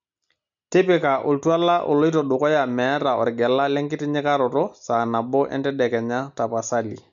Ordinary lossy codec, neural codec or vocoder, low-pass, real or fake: none; none; 7.2 kHz; real